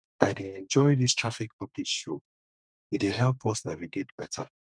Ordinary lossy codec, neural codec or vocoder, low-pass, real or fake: none; codec, 32 kHz, 1.9 kbps, SNAC; 9.9 kHz; fake